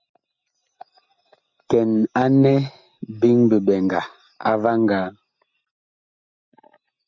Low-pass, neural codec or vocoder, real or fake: 7.2 kHz; none; real